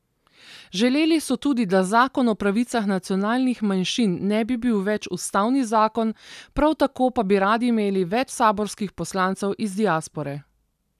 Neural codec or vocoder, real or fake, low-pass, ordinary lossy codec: none; real; 14.4 kHz; none